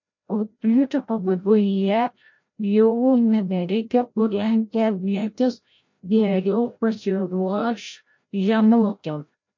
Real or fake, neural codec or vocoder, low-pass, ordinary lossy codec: fake; codec, 16 kHz, 0.5 kbps, FreqCodec, larger model; 7.2 kHz; MP3, 48 kbps